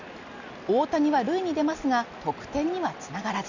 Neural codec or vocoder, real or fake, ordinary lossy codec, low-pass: none; real; none; 7.2 kHz